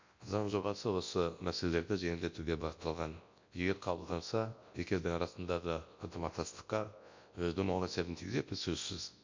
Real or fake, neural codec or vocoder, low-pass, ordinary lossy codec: fake; codec, 24 kHz, 0.9 kbps, WavTokenizer, large speech release; 7.2 kHz; MP3, 48 kbps